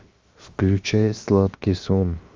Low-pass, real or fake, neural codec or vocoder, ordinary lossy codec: 7.2 kHz; fake; codec, 16 kHz, 0.7 kbps, FocalCodec; Opus, 32 kbps